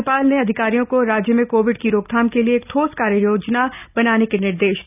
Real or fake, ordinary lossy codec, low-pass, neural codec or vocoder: real; none; 3.6 kHz; none